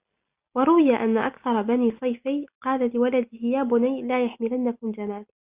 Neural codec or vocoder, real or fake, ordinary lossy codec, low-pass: none; real; Opus, 64 kbps; 3.6 kHz